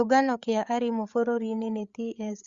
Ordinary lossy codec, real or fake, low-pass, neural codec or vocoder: Opus, 64 kbps; fake; 7.2 kHz; codec, 16 kHz, 8 kbps, FreqCodec, larger model